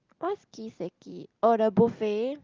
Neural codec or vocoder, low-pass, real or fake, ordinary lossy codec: none; 7.2 kHz; real; Opus, 32 kbps